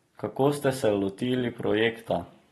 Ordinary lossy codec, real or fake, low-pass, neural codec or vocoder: AAC, 32 kbps; real; 19.8 kHz; none